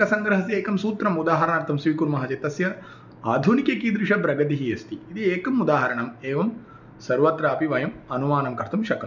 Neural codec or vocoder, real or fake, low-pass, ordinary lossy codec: none; real; 7.2 kHz; none